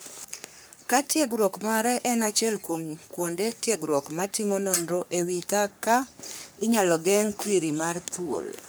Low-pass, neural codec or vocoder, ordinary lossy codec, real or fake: none; codec, 44.1 kHz, 3.4 kbps, Pupu-Codec; none; fake